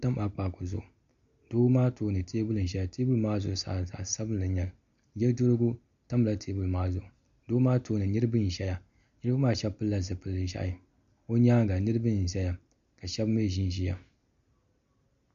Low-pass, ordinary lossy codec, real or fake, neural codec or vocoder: 7.2 kHz; MP3, 48 kbps; real; none